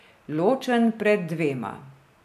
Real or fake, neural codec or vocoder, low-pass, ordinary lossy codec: real; none; 14.4 kHz; none